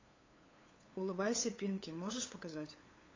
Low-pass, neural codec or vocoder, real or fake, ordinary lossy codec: 7.2 kHz; codec, 16 kHz, 8 kbps, FunCodec, trained on LibriTTS, 25 frames a second; fake; AAC, 32 kbps